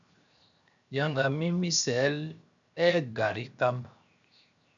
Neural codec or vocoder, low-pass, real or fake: codec, 16 kHz, 0.7 kbps, FocalCodec; 7.2 kHz; fake